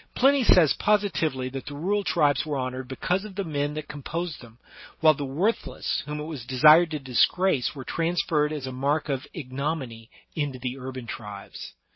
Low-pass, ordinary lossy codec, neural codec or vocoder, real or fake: 7.2 kHz; MP3, 24 kbps; none; real